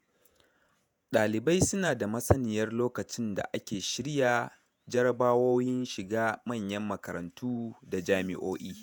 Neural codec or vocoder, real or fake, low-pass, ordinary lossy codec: vocoder, 48 kHz, 128 mel bands, Vocos; fake; none; none